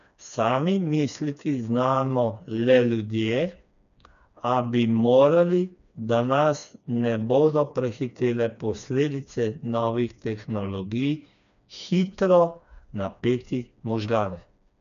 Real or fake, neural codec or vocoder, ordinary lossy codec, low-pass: fake; codec, 16 kHz, 2 kbps, FreqCodec, smaller model; none; 7.2 kHz